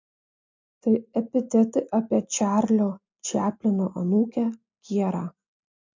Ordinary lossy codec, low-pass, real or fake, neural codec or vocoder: MP3, 48 kbps; 7.2 kHz; real; none